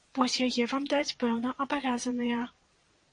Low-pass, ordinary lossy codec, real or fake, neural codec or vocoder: 9.9 kHz; AAC, 48 kbps; fake; vocoder, 22.05 kHz, 80 mel bands, Vocos